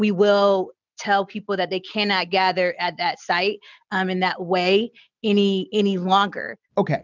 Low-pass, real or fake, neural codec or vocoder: 7.2 kHz; real; none